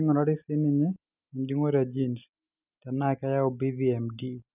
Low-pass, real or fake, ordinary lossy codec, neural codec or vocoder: 3.6 kHz; real; none; none